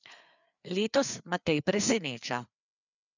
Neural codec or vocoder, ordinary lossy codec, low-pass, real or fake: codec, 16 kHz, 4 kbps, FreqCodec, larger model; none; 7.2 kHz; fake